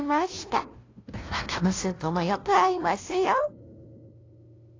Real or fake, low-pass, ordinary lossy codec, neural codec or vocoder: fake; 7.2 kHz; AAC, 32 kbps; codec, 16 kHz, 0.5 kbps, FunCodec, trained on LibriTTS, 25 frames a second